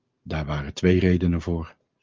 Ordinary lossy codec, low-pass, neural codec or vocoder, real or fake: Opus, 24 kbps; 7.2 kHz; none; real